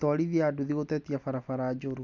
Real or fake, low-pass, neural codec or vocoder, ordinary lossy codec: real; 7.2 kHz; none; none